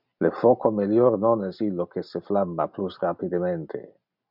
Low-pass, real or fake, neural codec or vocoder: 5.4 kHz; real; none